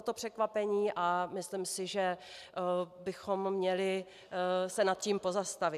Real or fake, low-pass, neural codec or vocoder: real; 14.4 kHz; none